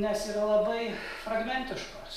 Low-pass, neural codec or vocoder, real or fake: 14.4 kHz; none; real